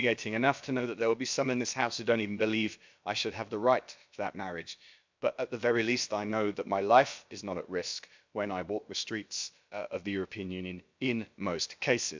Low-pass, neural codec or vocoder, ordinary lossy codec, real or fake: 7.2 kHz; codec, 16 kHz, about 1 kbps, DyCAST, with the encoder's durations; MP3, 64 kbps; fake